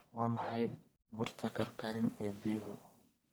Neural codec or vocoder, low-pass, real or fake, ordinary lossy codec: codec, 44.1 kHz, 1.7 kbps, Pupu-Codec; none; fake; none